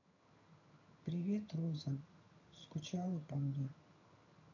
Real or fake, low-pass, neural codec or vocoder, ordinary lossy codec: fake; 7.2 kHz; vocoder, 22.05 kHz, 80 mel bands, HiFi-GAN; none